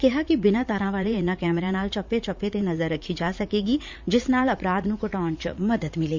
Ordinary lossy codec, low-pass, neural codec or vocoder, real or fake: MP3, 64 kbps; 7.2 kHz; vocoder, 44.1 kHz, 80 mel bands, Vocos; fake